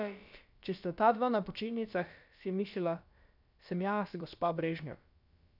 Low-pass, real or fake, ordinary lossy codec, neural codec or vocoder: 5.4 kHz; fake; none; codec, 16 kHz, about 1 kbps, DyCAST, with the encoder's durations